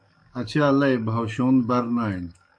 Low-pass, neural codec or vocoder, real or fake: 9.9 kHz; codec, 44.1 kHz, 7.8 kbps, DAC; fake